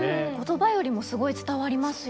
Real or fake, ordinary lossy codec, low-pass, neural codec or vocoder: real; none; none; none